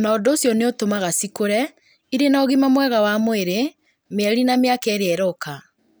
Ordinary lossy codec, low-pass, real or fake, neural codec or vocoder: none; none; real; none